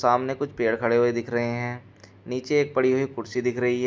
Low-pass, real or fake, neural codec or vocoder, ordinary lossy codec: none; real; none; none